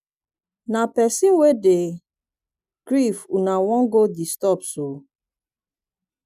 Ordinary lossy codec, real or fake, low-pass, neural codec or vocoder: none; real; 14.4 kHz; none